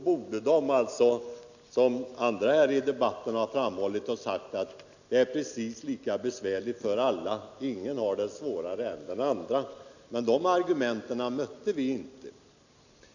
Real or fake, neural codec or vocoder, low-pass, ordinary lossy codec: real; none; 7.2 kHz; none